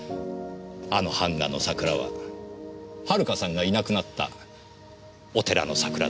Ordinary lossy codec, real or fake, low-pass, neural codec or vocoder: none; real; none; none